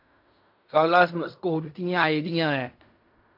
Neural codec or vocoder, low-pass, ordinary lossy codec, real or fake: codec, 16 kHz in and 24 kHz out, 0.4 kbps, LongCat-Audio-Codec, fine tuned four codebook decoder; 5.4 kHz; MP3, 48 kbps; fake